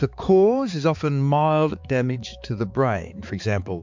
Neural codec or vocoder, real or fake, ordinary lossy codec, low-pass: codec, 16 kHz, 4 kbps, X-Codec, HuBERT features, trained on balanced general audio; fake; MP3, 64 kbps; 7.2 kHz